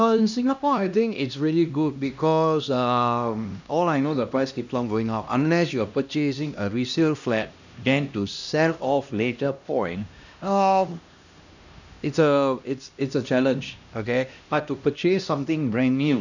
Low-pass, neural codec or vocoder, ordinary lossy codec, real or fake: 7.2 kHz; codec, 16 kHz, 1 kbps, X-Codec, HuBERT features, trained on LibriSpeech; none; fake